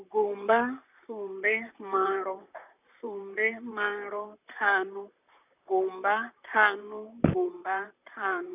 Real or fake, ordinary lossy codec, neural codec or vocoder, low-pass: fake; none; vocoder, 44.1 kHz, 128 mel bands, Pupu-Vocoder; 3.6 kHz